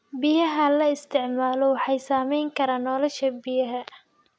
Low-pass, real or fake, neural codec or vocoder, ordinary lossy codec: none; real; none; none